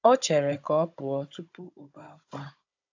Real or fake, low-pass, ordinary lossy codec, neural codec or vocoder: fake; 7.2 kHz; AAC, 48 kbps; codec, 16 kHz, 16 kbps, FunCodec, trained on Chinese and English, 50 frames a second